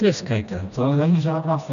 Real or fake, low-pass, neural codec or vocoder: fake; 7.2 kHz; codec, 16 kHz, 1 kbps, FreqCodec, smaller model